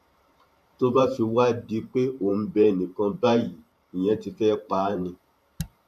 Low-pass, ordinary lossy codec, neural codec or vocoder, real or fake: 14.4 kHz; none; vocoder, 44.1 kHz, 128 mel bands every 512 samples, BigVGAN v2; fake